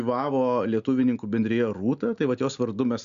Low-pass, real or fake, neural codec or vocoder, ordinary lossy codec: 7.2 kHz; real; none; Opus, 64 kbps